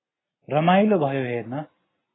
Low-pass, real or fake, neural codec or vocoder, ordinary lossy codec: 7.2 kHz; real; none; AAC, 16 kbps